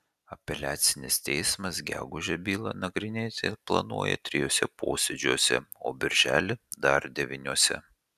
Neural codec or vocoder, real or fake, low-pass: none; real; 14.4 kHz